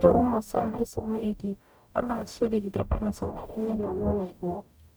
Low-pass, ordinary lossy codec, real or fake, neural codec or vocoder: none; none; fake; codec, 44.1 kHz, 0.9 kbps, DAC